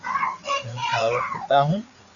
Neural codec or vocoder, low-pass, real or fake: codec, 16 kHz, 4 kbps, FreqCodec, larger model; 7.2 kHz; fake